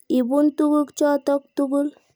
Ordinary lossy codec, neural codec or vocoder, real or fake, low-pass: none; none; real; none